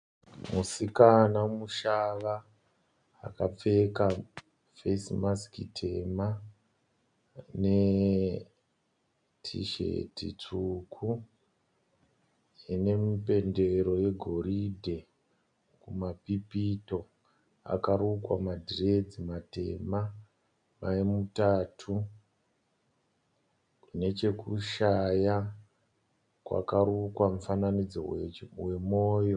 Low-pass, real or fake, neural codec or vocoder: 9.9 kHz; real; none